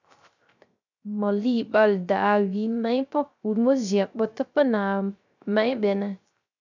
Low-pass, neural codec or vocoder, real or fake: 7.2 kHz; codec, 16 kHz, 0.3 kbps, FocalCodec; fake